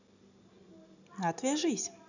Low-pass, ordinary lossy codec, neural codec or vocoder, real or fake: 7.2 kHz; none; none; real